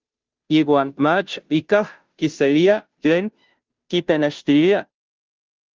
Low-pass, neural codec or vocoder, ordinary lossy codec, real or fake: 7.2 kHz; codec, 16 kHz, 0.5 kbps, FunCodec, trained on Chinese and English, 25 frames a second; Opus, 32 kbps; fake